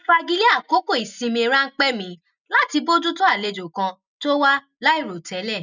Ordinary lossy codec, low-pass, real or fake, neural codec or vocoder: none; 7.2 kHz; real; none